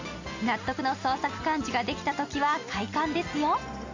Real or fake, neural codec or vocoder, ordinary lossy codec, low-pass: real; none; AAC, 48 kbps; 7.2 kHz